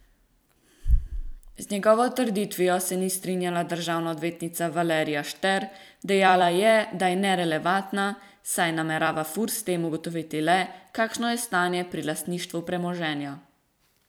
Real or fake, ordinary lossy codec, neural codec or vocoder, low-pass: fake; none; vocoder, 44.1 kHz, 128 mel bands every 256 samples, BigVGAN v2; none